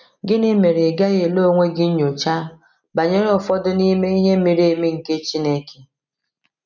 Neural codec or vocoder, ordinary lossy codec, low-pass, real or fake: none; none; 7.2 kHz; real